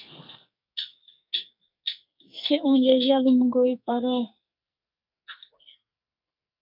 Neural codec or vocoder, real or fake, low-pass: codec, 44.1 kHz, 2.6 kbps, SNAC; fake; 5.4 kHz